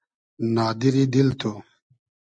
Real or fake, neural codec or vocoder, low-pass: real; none; 9.9 kHz